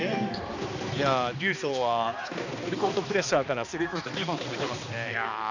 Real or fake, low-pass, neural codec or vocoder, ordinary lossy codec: fake; 7.2 kHz; codec, 16 kHz, 2 kbps, X-Codec, HuBERT features, trained on balanced general audio; none